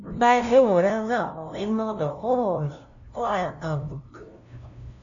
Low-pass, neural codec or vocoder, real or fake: 7.2 kHz; codec, 16 kHz, 0.5 kbps, FunCodec, trained on LibriTTS, 25 frames a second; fake